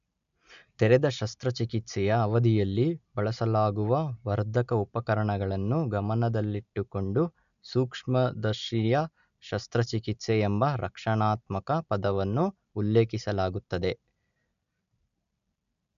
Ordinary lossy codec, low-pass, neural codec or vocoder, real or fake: AAC, 96 kbps; 7.2 kHz; none; real